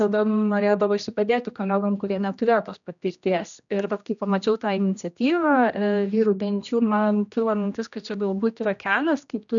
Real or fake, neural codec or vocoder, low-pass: fake; codec, 16 kHz, 1 kbps, X-Codec, HuBERT features, trained on general audio; 7.2 kHz